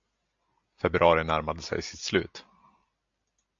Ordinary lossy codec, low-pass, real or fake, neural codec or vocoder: AAC, 64 kbps; 7.2 kHz; real; none